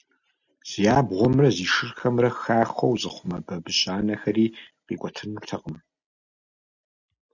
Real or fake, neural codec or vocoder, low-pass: real; none; 7.2 kHz